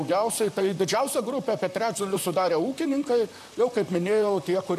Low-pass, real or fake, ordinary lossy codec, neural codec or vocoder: 14.4 kHz; fake; MP3, 96 kbps; vocoder, 44.1 kHz, 128 mel bands, Pupu-Vocoder